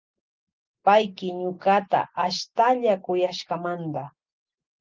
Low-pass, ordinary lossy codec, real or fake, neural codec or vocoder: 7.2 kHz; Opus, 32 kbps; real; none